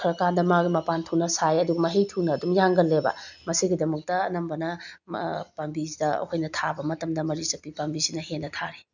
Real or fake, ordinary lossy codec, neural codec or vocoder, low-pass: real; none; none; 7.2 kHz